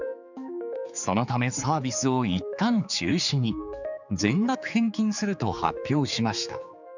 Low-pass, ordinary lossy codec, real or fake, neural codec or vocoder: 7.2 kHz; none; fake; codec, 16 kHz, 4 kbps, X-Codec, HuBERT features, trained on general audio